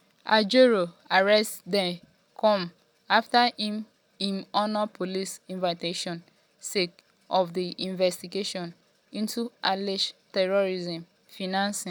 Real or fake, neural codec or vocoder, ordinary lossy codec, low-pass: real; none; none; none